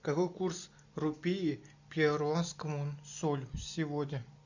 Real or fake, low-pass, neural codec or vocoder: real; 7.2 kHz; none